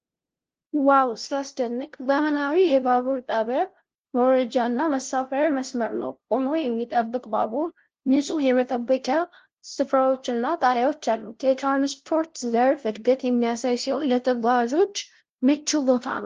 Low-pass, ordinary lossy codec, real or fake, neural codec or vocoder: 7.2 kHz; Opus, 16 kbps; fake; codec, 16 kHz, 0.5 kbps, FunCodec, trained on LibriTTS, 25 frames a second